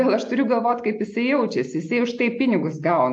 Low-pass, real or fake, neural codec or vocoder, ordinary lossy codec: 9.9 kHz; real; none; AAC, 64 kbps